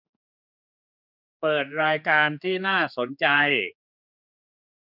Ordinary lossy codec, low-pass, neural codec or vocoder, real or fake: none; 5.4 kHz; codec, 16 kHz, 4 kbps, X-Codec, HuBERT features, trained on general audio; fake